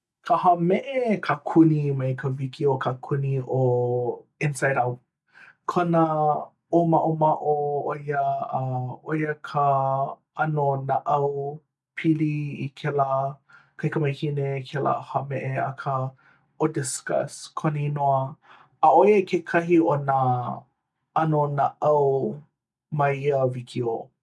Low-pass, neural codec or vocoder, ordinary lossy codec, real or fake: none; none; none; real